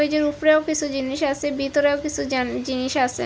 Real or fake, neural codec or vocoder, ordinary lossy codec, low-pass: real; none; none; none